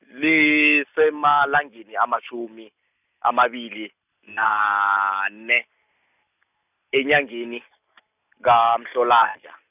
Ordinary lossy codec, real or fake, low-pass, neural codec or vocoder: none; real; 3.6 kHz; none